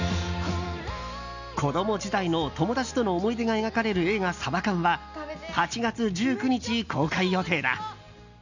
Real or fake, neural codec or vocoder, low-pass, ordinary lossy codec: real; none; 7.2 kHz; none